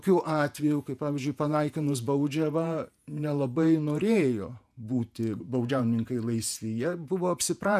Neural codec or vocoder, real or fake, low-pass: vocoder, 48 kHz, 128 mel bands, Vocos; fake; 14.4 kHz